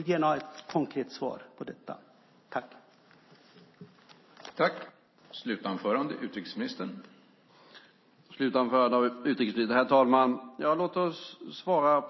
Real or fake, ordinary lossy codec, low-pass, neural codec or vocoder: real; MP3, 24 kbps; 7.2 kHz; none